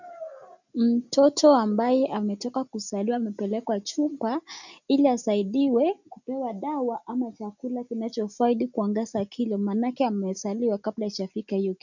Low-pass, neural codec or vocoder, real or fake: 7.2 kHz; none; real